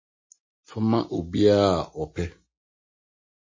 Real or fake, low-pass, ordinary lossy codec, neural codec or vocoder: real; 7.2 kHz; MP3, 32 kbps; none